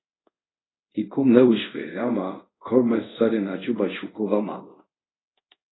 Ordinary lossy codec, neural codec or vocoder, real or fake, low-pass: AAC, 16 kbps; codec, 24 kHz, 0.5 kbps, DualCodec; fake; 7.2 kHz